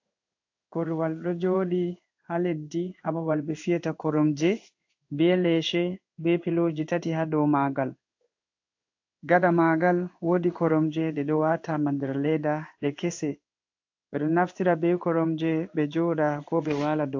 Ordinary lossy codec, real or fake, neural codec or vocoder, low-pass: AAC, 48 kbps; fake; codec, 16 kHz in and 24 kHz out, 1 kbps, XY-Tokenizer; 7.2 kHz